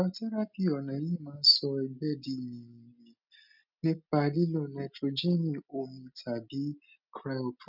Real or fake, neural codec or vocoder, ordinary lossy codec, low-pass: real; none; none; 5.4 kHz